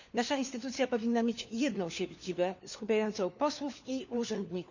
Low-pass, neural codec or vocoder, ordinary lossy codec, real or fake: 7.2 kHz; codec, 16 kHz, 4 kbps, FunCodec, trained on LibriTTS, 50 frames a second; none; fake